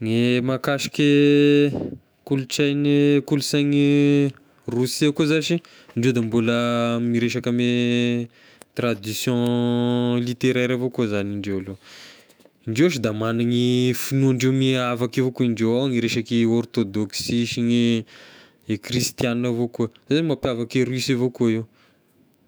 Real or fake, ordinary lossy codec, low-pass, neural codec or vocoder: fake; none; none; autoencoder, 48 kHz, 128 numbers a frame, DAC-VAE, trained on Japanese speech